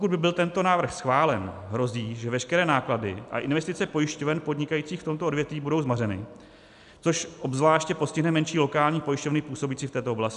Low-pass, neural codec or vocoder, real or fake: 10.8 kHz; none; real